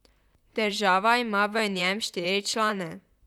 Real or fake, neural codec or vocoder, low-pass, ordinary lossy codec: fake; vocoder, 44.1 kHz, 128 mel bands, Pupu-Vocoder; 19.8 kHz; none